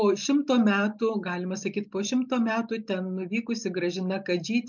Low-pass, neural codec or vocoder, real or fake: 7.2 kHz; none; real